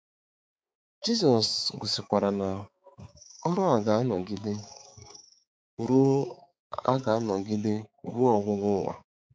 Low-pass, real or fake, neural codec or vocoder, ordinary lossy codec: none; fake; codec, 16 kHz, 4 kbps, X-Codec, HuBERT features, trained on balanced general audio; none